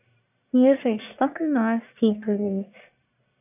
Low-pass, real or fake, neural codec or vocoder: 3.6 kHz; fake; codec, 44.1 kHz, 1.7 kbps, Pupu-Codec